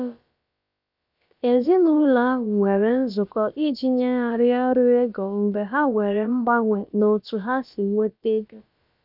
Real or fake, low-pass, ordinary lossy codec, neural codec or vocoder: fake; 5.4 kHz; none; codec, 16 kHz, about 1 kbps, DyCAST, with the encoder's durations